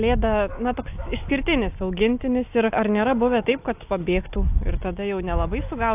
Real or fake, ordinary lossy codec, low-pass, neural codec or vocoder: real; AAC, 32 kbps; 3.6 kHz; none